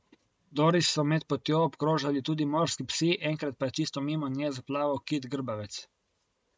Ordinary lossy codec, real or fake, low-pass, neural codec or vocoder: none; real; none; none